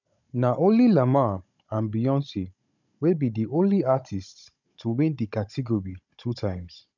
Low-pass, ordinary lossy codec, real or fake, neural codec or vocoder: 7.2 kHz; none; fake; codec, 16 kHz, 16 kbps, FunCodec, trained on Chinese and English, 50 frames a second